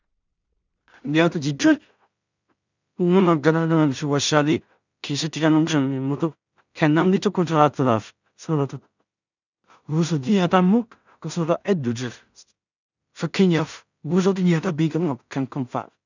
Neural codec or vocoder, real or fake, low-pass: codec, 16 kHz in and 24 kHz out, 0.4 kbps, LongCat-Audio-Codec, two codebook decoder; fake; 7.2 kHz